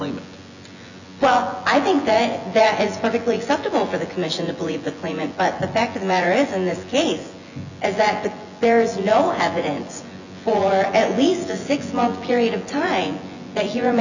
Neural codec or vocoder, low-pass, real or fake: vocoder, 24 kHz, 100 mel bands, Vocos; 7.2 kHz; fake